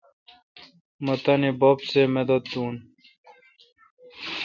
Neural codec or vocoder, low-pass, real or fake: none; 7.2 kHz; real